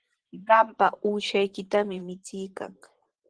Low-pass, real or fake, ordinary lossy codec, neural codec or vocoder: 9.9 kHz; fake; Opus, 16 kbps; vocoder, 44.1 kHz, 128 mel bands every 512 samples, BigVGAN v2